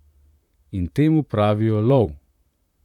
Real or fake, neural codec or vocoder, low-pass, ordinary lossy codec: fake; vocoder, 44.1 kHz, 128 mel bands, Pupu-Vocoder; 19.8 kHz; none